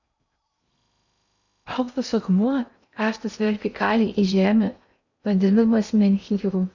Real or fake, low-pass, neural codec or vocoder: fake; 7.2 kHz; codec, 16 kHz in and 24 kHz out, 0.8 kbps, FocalCodec, streaming, 65536 codes